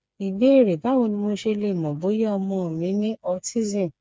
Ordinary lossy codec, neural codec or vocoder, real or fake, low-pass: none; codec, 16 kHz, 4 kbps, FreqCodec, smaller model; fake; none